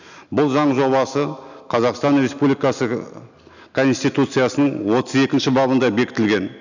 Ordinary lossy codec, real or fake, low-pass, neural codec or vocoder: none; real; 7.2 kHz; none